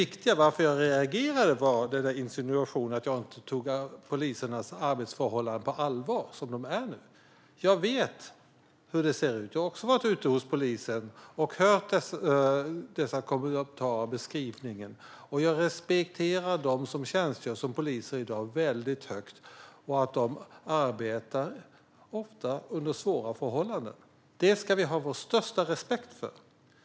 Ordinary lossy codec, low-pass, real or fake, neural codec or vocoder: none; none; real; none